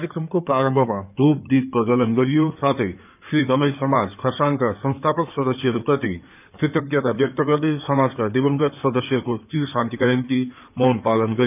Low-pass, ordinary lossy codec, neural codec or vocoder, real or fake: 3.6 kHz; none; codec, 16 kHz in and 24 kHz out, 2.2 kbps, FireRedTTS-2 codec; fake